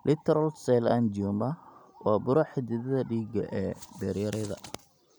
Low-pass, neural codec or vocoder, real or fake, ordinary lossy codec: none; none; real; none